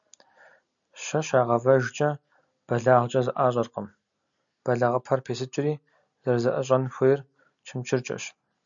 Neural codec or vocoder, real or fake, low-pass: none; real; 7.2 kHz